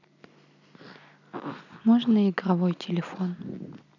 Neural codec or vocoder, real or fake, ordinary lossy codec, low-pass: none; real; none; 7.2 kHz